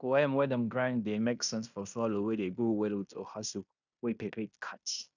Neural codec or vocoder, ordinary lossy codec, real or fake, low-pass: codec, 16 kHz in and 24 kHz out, 0.9 kbps, LongCat-Audio-Codec, fine tuned four codebook decoder; none; fake; 7.2 kHz